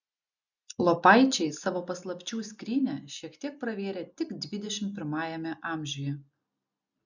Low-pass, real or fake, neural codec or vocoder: 7.2 kHz; real; none